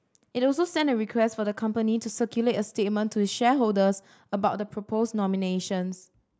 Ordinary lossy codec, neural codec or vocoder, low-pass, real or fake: none; none; none; real